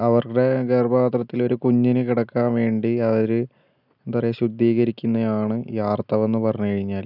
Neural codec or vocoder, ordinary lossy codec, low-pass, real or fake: none; none; 5.4 kHz; real